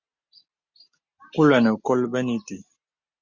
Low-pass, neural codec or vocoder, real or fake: 7.2 kHz; none; real